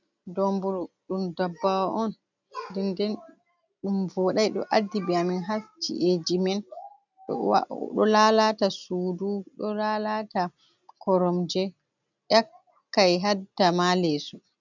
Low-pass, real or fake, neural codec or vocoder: 7.2 kHz; real; none